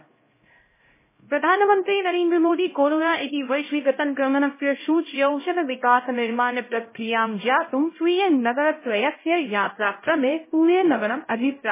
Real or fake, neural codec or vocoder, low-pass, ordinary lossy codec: fake; codec, 16 kHz, 0.5 kbps, X-Codec, HuBERT features, trained on LibriSpeech; 3.6 kHz; MP3, 16 kbps